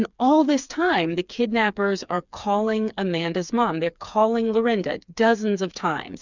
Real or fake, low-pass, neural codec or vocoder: fake; 7.2 kHz; codec, 16 kHz, 4 kbps, FreqCodec, smaller model